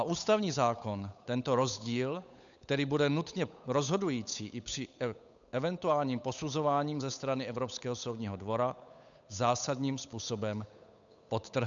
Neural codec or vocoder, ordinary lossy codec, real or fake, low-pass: codec, 16 kHz, 8 kbps, FunCodec, trained on Chinese and English, 25 frames a second; MP3, 96 kbps; fake; 7.2 kHz